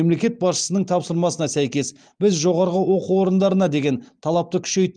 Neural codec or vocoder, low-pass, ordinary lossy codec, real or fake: none; 9.9 kHz; Opus, 32 kbps; real